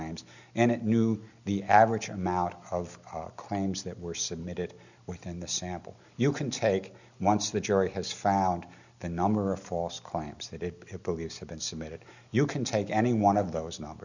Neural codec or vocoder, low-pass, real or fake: none; 7.2 kHz; real